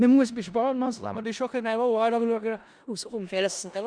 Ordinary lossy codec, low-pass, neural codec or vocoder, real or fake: Opus, 64 kbps; 9.9 kHz; codec, 16 kHz in and 24 kHz out, 0.4 kbps, LongCat-Audio-Codec, four codebook decoder; fake